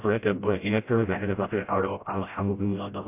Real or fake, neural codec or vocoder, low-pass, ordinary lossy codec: fake; codec, 16 kHz, 0.5 kbps, FreqCodec, smaller model; 3.6 kHz; AAC, 24 kbps